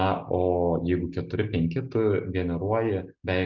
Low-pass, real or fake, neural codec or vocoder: 7.2 kHz; real; none